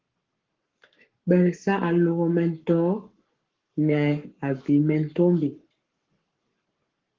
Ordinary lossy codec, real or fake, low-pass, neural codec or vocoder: Opus, 16 kbps; fake; 7.2 kHz; codec, 44.1 kHz, 7.8 kbps, Pupu-Codec